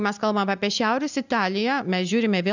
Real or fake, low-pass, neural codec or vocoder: real; 7.2 kHz; none